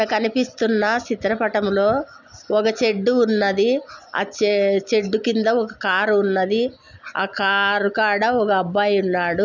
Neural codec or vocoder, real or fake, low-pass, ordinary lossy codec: none; real; 7.2 kHz; none